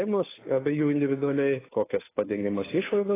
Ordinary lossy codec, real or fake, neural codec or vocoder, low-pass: AAC, 16 kbps; fake; codec, 16 kHz, 2 kbps, FunCodec, trained on Chinese and English, 25 frames a second; 3.6 kHz